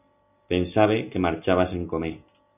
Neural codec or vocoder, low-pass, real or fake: none; 3.6 kHz; real